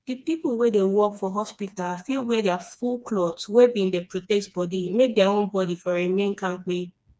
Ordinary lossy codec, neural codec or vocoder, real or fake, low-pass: none; codec, 16 kHz, 2 kbps, FreqCodec, smaller model; fake; none